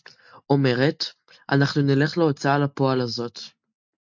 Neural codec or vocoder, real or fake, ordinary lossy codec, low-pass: none; real; MP3, 64 kbps; 7.2 kHz